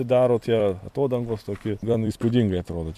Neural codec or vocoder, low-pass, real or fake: vocoder, 44.1 kHz, 128 mel bands every 256 samples, BigVGAN v2; 14.4 kHz; fake